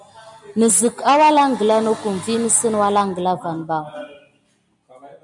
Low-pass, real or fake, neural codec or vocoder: 10.8 kHz; real; none